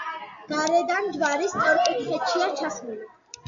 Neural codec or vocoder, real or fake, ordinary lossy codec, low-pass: none; real; AAC, 64 kbps; 7.2 kHz